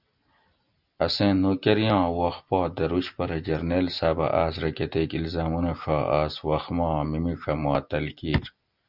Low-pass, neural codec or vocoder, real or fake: 5.4 kHz; none; real